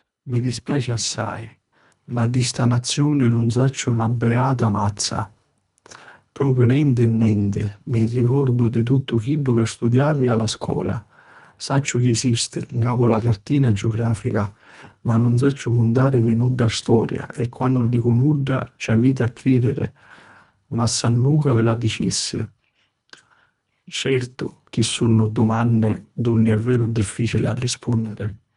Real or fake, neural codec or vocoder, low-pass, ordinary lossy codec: fake; codec, 24 kHz, 1.5 kbps, HILCodec; 10.8 kHz; none